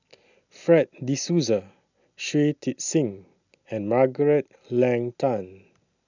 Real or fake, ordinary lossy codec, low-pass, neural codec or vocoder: real; none; 7.2 kHz; none